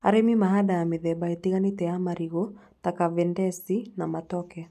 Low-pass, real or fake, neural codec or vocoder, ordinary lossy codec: 14.4 kHz; real; none; none